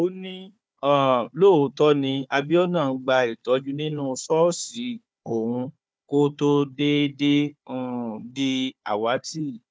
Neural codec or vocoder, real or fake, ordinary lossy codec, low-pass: codec, 16 kHz, 4 kbps, FunCodec, trained on Chinese and English, 50 frames a second; fake; none; none